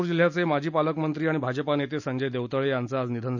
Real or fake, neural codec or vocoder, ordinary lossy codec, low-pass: real; none; none; 7.2 kHz